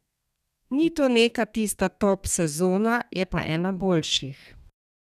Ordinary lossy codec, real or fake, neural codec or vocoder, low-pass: none; fake; codec, 32 kHz, 1.9 kbps, SNAC; 14.4 kHz